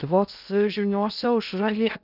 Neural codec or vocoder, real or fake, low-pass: codec, 16 kHz in and 24 kHz out, 0.6 kbps, FocalCodec, streaming, 2048 codes; fake; 5.4 kHz